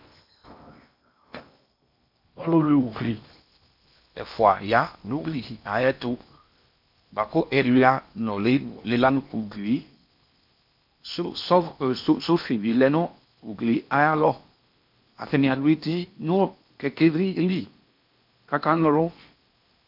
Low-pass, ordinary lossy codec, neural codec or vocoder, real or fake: 5.4 kHz; MP3, 48 kbps; codec, 16 kHz in and 24 kHz out, 0.8 kbps, FocalCodec, streaming, 65536 codes; fake